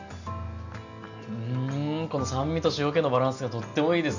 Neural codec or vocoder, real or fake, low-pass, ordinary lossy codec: none; real; 7.2 kHz; none